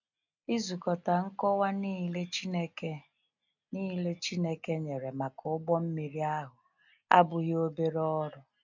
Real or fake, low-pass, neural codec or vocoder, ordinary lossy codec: real; 7.2 kHz; none; none